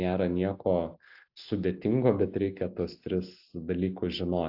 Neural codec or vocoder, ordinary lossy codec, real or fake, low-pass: none; AAC, 48 kbps; real; 5.4 kHz